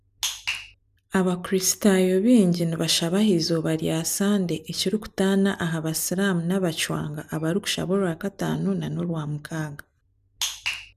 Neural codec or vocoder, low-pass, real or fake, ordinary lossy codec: none; 14.4 kHz; real; none